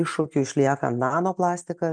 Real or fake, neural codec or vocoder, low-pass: real; none; 9.9 kHz